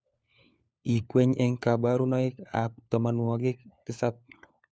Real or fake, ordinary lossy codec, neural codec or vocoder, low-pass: fake; none; codec, 16 kHz, 4 kbps, FunCodec, trained on LibriTTS, 50 frames a second; none